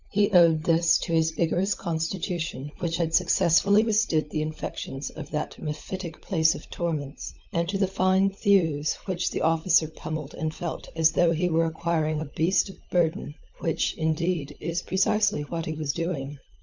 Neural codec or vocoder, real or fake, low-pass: codec, 16 kHz, 16 kbps, FunCodec, trained on LibriTTS, 50 frames a second; fake; 7.2 kHz